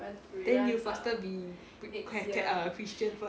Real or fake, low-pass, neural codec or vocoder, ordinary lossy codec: real; none; none; none